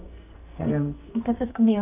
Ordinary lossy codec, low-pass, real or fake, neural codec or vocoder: none; 3.6 kHz; fake; codec, 44.1 kHz, 2.6 kbps, SNAC